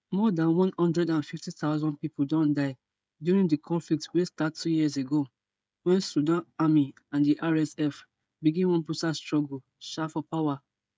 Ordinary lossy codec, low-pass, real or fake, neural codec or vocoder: none; none; fake; codec, 16 kHz, 16 kbps, FreqCodec, smaller model